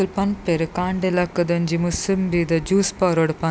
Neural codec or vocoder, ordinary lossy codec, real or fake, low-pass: none; none; real; none